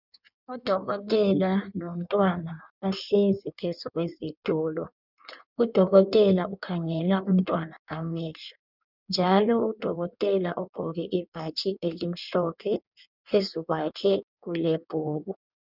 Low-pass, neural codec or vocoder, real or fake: 5.4 kHz; codec, 16 kHz in and 24 kHz out, 1.1 kbps, FireRedTTS-2 codec; fake